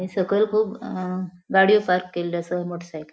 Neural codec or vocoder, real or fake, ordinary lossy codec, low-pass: none; real; none; none